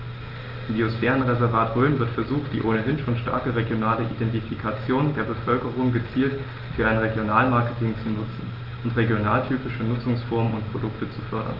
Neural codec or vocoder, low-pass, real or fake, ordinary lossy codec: none; 5.4 kHz; real; Opus, 32 kbps